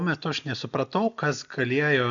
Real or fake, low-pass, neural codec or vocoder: real; 7.2 kHz; none